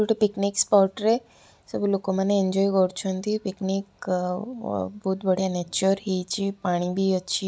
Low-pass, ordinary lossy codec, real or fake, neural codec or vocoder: none; none; real; none